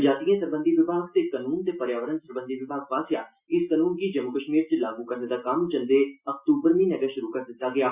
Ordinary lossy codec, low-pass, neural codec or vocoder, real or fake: Opus, 64 kbps; 3.6 kHz; none; real